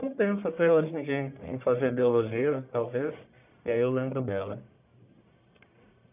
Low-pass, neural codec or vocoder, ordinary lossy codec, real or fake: 3.6 kHz; codec, 44.1 kHz, 1.7 kbps, Pupu-Codec; none; fake